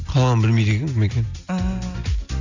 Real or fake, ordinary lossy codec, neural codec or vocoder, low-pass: real; none; none; 7.2 kHz